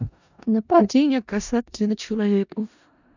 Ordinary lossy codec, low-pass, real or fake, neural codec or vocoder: none; 7.2 kHz; fake; codec, 16 kHz in and 24 kHz out, 0.4 kbps, LongCat-Audio-Codec, four codebook decoder